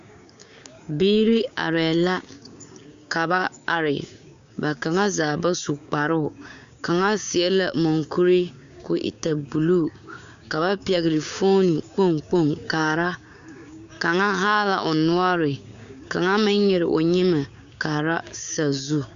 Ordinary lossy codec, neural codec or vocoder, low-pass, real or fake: MP3, 64 kbps; codec, 16 kHz, 6 kbps, DAC; 7.2 kHz; fake